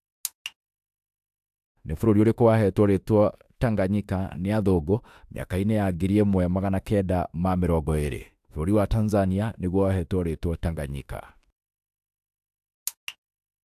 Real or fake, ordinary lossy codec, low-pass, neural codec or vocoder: fake; AAC, 96 kbps; 14.4 kHz; autoencoder, 48 kHz, 32 numbers a frame, DAC-VAE, trained on Japanese speech